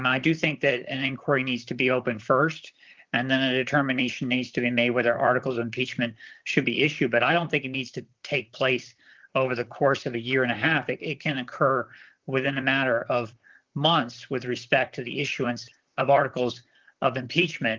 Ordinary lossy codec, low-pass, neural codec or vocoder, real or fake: Opus, 32 kbps; 7.2 kHz; vocoder, 44.1 kHz, 128 mel bands, Pupu-Vocoder; fake